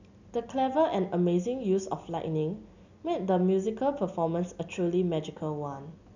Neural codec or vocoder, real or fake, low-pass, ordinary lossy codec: none; real; 7.2 kHz; none